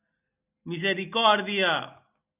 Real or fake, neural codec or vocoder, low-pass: real; none; 3.6 kHz